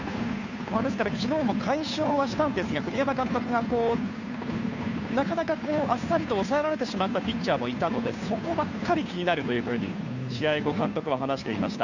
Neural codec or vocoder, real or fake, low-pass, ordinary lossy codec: codec, 16 kHz, 2 kbps, FunCodec, trained on Chinese and English, 25 frames a second; fake; 7.2 kHz; none